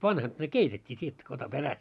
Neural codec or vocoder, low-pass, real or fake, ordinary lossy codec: none; none; real; none